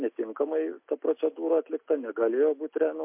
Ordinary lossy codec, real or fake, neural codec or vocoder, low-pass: MP3, 32 kbps; real; none; 3.6 kHz